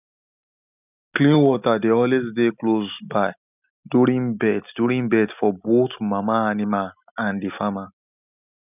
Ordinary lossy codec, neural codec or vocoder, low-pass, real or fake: none; none; 3.6 kHz; real